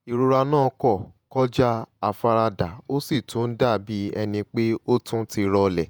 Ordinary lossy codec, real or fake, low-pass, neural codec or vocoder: none; fake; none; vocoder, 48 kHz, 128 mel bands, Vocos